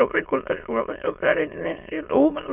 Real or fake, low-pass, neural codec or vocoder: fake; 3.6 kHz; autoencoder, 22.05 kHz, a latent of 192 numbers a frame, VITS, trained on many speakers